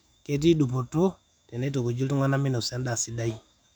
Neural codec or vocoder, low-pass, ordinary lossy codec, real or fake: autoencoder, 48 kHz, 128 numbers a frame, DAC-VAE, trained on Japanese speech; 19.8 kHz; none; fake